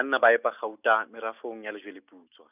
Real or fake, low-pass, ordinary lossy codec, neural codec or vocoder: real; 3.6 kHz; none; none